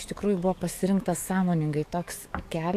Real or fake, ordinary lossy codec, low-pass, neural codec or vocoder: fake; AAC, 64 kbps; 14.4 kHz; codec, 44.1 kHz, 7.8 kbps, DAC